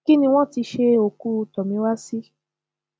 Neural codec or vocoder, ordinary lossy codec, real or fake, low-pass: none; none; real; none